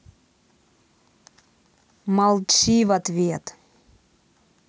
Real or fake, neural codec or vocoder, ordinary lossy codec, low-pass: real; none; none; none